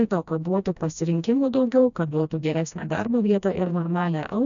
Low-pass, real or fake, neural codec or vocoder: 7.2 kHz; fake; codec, 16 kHz, 1 kbps, FreqCodec, smaller model